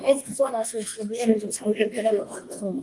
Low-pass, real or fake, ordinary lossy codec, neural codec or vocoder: 10.8 kHz; fake; Opus, 24 kbps; codec, 24 kHz, 1.2 kbps, DualCodec